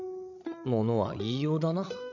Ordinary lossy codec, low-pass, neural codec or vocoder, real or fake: none; 7.2 kHz; codec, 16 kHz, 16 kbps, FreqCodec, larger model; fake